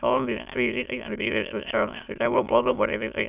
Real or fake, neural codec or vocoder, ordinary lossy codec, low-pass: fake; autoencoder, 22.05 kHz, a latent of 192 numbers a frame, VITS, trained on many speakers; none; 3.6 kHz